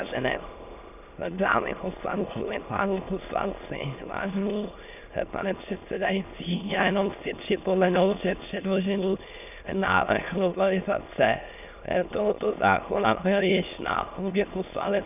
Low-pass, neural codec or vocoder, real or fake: 3.6 kHz; autoencoder, 22.05 kHz, a latent of 192 numbers a frame, VITS, trained on many speakers; fake